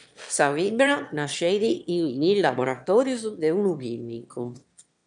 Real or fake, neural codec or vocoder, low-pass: fake; autoencoder, 22.05 kHz, a latent of 192 numbers a frame, VITS, trained on one speaker; 9.9 kHz